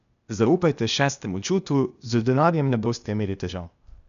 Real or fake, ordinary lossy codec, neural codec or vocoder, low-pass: fake; none; codec, 16 kHz, 0.8 kbps, ZipCodec; 7.2 kHz